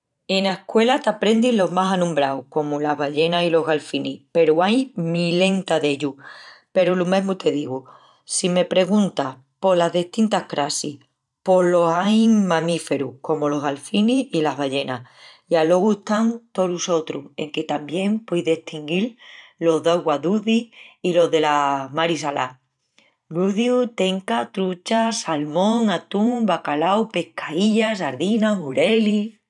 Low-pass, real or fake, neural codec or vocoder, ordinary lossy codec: 10.8 kHz; fake; vocoder, 44.1 kHz, 128 mel bands every 512 samples, BigVGAN v2; none